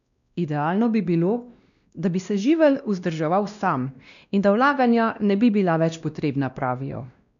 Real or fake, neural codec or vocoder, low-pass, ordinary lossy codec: fake; codec, 16 kHz, 1 kbps, X-Codec, WavLM features, trained on Multilingual LibriSpeech; 7.2 kHz; none